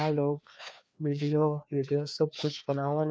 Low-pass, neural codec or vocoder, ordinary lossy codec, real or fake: none; codec, 16 kHz, 2 kbps, FreqCodec, larger model; none; fake